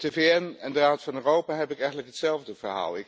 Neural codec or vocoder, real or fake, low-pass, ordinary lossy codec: none; real; none; none